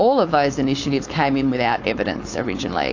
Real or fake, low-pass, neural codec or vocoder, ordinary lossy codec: fake; 7.2 kHz; codec, 16 kHz, 4.8 kbps, FACodec; AAC, 48 kbps